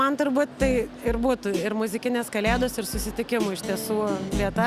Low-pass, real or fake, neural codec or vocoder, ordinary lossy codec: 14.4 kHz; real; none; Opus, 64 kbps